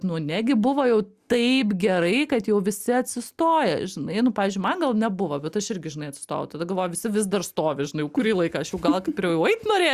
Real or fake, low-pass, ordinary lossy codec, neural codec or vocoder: real; 14.4 kHz; Opus, 64 kbps; none